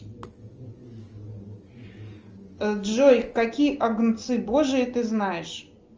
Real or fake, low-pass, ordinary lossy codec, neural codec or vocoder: real; 7.2 kHz; Opus, 24 kbps; none